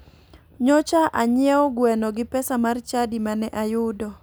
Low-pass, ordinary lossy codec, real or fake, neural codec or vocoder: none; none; real; none